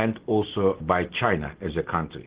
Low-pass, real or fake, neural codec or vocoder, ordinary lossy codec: 3.6 kHz; real; none; Opus, 16 kbps